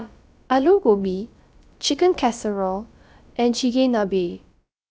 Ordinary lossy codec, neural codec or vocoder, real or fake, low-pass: none; codec, 16 kHz, about 1 kbps, DyCAST, with the encoder's durations; fake; none